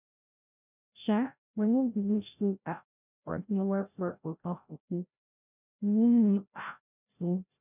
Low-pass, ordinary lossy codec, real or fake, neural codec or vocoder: 3.6 kHz; none; fake; codec, 16 kHz, 0.5 kbps, FreqCodec, larger model